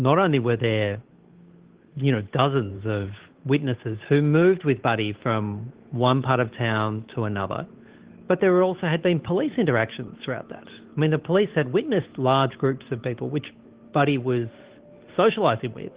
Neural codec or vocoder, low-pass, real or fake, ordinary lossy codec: codec, 16 kHz, 8 kbps, FunCodec, trained on Chinese and English, 25 frames a second; 3.6 kHz; fake; Opus, 16 kbps